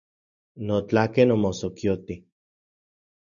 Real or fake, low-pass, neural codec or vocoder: real; 7.2 kHz; none